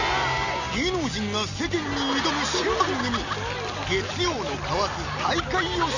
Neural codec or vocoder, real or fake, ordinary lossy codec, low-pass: none; real; none; 7.2 kHz